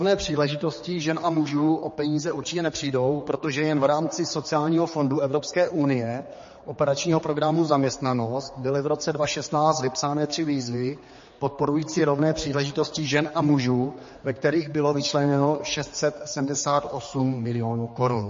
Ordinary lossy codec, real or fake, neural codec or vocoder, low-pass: MP3, 32 kbps; fake; codec, 16 kHz, 4 kbps, X-Codec, HuBERT features, trained on general audio; 7.2 kHz